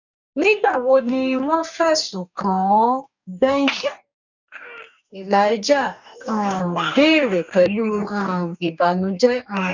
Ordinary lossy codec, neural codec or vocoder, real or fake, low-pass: none; codec, 44.1 kHz, 2.6 kbps, DAC; fake; 7.2 kHz